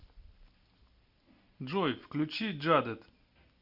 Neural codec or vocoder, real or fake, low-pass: none; real; 5.4 kHz